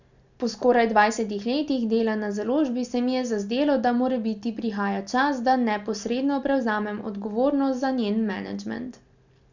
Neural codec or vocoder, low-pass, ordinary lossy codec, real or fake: none; 7.2 kHz; none; real